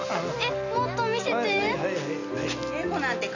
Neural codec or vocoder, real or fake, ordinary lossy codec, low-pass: none; real; none; 7.2 kHz